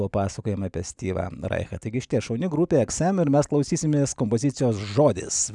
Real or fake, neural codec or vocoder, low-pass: real; none; 10.8 kHz